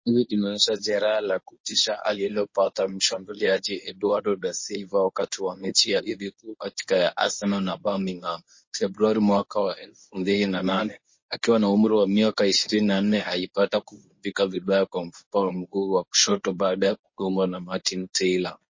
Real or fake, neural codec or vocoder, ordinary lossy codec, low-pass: fake; codec, 24 kHz, 0.9 kbps, WavTokenizer, medium speech release version 1; MP3, 32 kbps; 7.2 kHz